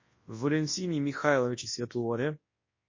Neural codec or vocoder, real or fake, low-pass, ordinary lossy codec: codec, 24 kHz, 0.9 kbps, WavTokenizer, large speech release; fake; 7.2 kHz; MP3, 32 kbps